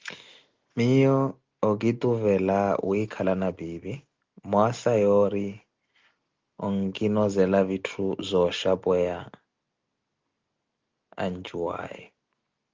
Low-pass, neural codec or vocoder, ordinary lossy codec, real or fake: 7.2 kHz; none; Opus, 32 kbps; real